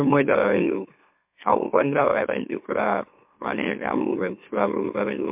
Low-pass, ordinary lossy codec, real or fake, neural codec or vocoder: 3.6 kHz; MP3, 32 kbps; fake; autoencoder, 44.1 kHz, a latent of 192 numbers a frame, MeloTTS